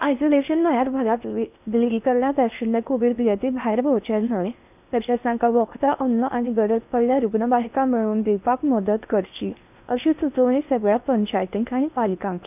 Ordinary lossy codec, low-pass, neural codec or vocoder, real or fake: none; 3.6 kHz; codec, 16 kHz in and 24 kHz out, 0.6 kbps, FocalCodec, streaming, 4096 codes; fake